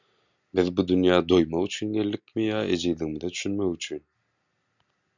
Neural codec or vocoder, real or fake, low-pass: none; real; 7.2 kHz